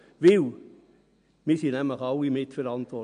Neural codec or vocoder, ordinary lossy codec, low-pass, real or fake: none; MP3, 64 kbps; 9.9 kHz; real